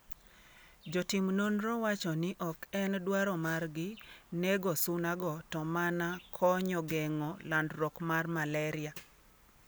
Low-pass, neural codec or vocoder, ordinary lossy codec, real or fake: none; none; none; real